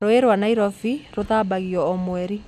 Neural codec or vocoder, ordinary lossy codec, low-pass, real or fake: none; none; 14.4 kHz; real